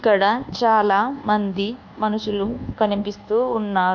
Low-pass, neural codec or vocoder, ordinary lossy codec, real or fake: 7.2 kHz; codec, 24 kHz, 1.2 kbps, DualCodec; none; fake